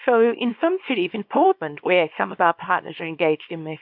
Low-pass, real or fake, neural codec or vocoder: 5.4 kHz; fake; codec, 24 kHz, 0.9 kbps, WavTokenizer, small release